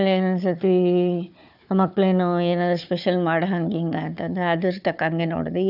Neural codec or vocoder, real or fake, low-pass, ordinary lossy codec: codec, 16 kHz, 4 kbps, FunCodec, trained on Chinese and English, 50 frames a second; fake; 5.4 kHz; none